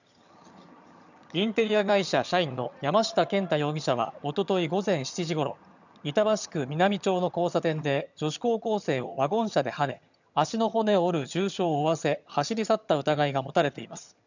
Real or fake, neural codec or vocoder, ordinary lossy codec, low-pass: fake; vocoder, 22.05 kHz, 80 mel bands, HiFi-GAN; none; 7.2 kHz